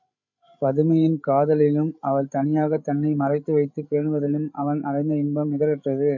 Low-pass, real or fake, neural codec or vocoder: 7.2 kHz; fake; codec, 16 kHz, 16 kbps, FreqCodec, larger model